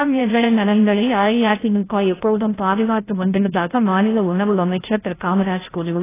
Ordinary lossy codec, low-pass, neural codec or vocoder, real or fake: AAC, 16 kbps; 3.6 kHz; codec, 16 kHz, 0.5 kbps, FreqCodec, larger model; fake